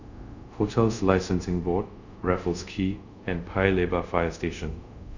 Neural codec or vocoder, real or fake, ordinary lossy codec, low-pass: codec, 24 kHz, 0.5 kbps, DualCodec; fake; none; 7.2 kHz